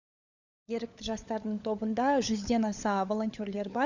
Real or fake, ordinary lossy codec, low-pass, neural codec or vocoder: fake; none; 7.2 kHz; codec, 16 kHz, 16 kbps, FunCodec, trained on LibriTTS, 50 frames a second